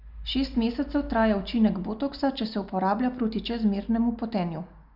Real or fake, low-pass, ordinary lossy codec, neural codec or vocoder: real; 5.4 kHz; none; none